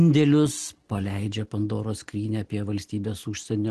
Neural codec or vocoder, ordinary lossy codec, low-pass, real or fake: none; Opus, 16 kbps; 10.8 kHz; real